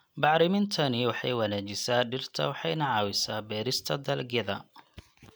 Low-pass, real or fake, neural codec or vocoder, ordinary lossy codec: none; real; none; none